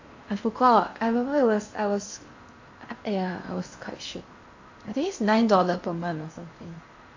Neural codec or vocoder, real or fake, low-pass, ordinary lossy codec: codec, 16 kHz in and 24 kHz out, 0.8 kbps, FocalCodec, streaming, 65536 codes; fake; 7.2 kHz; AAC, 48 kbps